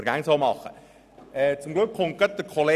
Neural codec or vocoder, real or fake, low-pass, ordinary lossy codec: none; real; 14.4 kHz; none